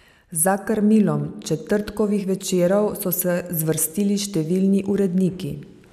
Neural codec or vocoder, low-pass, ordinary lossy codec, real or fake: none; 14.4 kHz; none; real